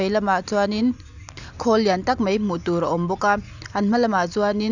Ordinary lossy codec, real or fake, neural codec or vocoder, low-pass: none; real; none; 7.2 kHz